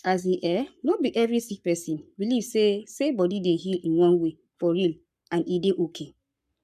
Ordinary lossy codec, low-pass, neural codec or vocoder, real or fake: none; 14.4 kHz; codec, 44.1 kHz, 7.8 kbps, Pupu-Codec; fake